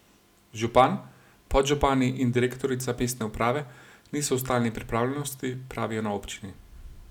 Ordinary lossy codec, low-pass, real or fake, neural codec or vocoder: none; 19.8 kHz; real; none